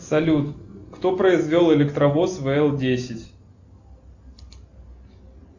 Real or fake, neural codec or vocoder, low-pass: real; none; 7.2 kHz